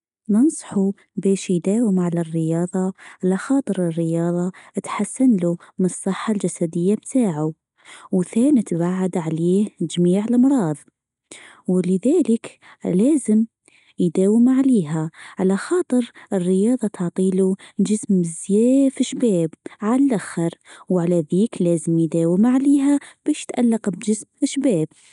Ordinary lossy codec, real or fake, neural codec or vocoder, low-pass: none; real; none; 10.8 kHz